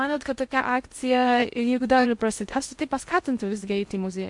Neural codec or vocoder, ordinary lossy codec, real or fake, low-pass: codec, 16 kHz in and 24 kHz out, 0.6 kbps, FocalCodec, streaming, 2048 codes; MP3, 64 kbps; fake; 10.8 kHz